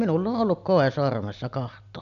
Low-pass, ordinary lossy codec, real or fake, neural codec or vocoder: 7.2 kHz; none; real; none